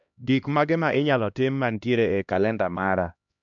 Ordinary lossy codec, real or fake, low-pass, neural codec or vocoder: MP3, 64 kbps; fake; 7.2 kHz; codec, 16 kHz, 1 kbps, X-Codec, HuBERT features, trained on LibriSpeech